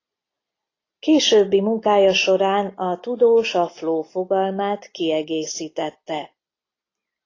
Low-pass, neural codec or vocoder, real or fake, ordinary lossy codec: 7.2 kHz; none; real; AAC, 32 kbps